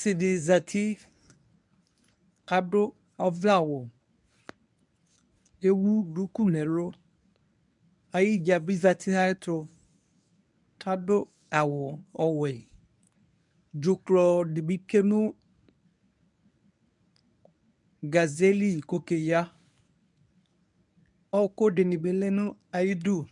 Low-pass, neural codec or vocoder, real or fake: 10.8 kHz; codec, 24 kHz, 0.9 kbps, WavTokenizer, medium speech release version 1; fake